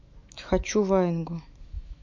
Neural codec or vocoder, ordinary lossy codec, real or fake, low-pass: autoencoder, 48 kHz, 128 numbers a frame, DAC-VAE, trained on Japanese speech; MP3, 48 kbps; fake; 7.2 kHz